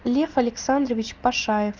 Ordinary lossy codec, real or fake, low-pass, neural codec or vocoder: Opus, 32 kbps; real; 7.2 kHz; none